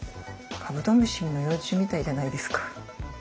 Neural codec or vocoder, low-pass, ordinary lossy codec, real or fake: none; none; none; real